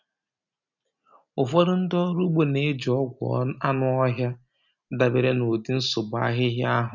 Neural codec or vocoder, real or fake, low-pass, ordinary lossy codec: none; real; 7.2 kHz; none